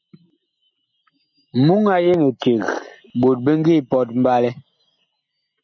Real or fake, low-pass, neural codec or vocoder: real; 7.2 kHz; none